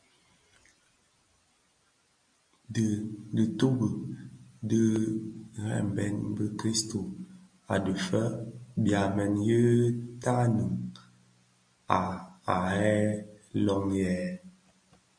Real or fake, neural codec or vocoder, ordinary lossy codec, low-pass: real; none; MP3, 64 kbps; 9.9 kHz